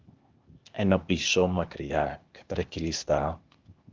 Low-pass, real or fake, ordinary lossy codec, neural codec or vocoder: 7.2 kHz; fake; Opus, 24 kbps; codec, 16 kHz, 0.8 kbps, ZipCodec